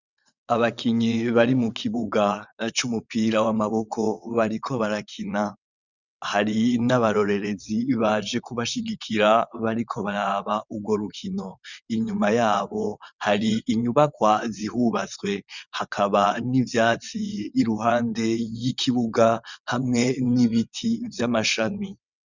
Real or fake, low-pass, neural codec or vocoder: fake; 7.2 kHz; vocoder, 22.05 kHz, 80 mel bands, WaveNeXt